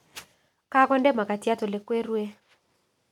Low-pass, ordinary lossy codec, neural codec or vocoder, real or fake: 19.8 kHz; none; none; real